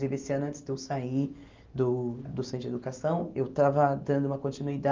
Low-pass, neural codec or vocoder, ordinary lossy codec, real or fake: 7.2 kHz; none; Opus, 24 kbps; real